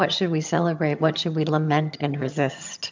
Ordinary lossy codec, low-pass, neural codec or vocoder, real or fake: MP3, 64 kbps; 7.2 kHz; vocoder, 22.05 kHz, 80 mel bands, HiFi-GAN; fake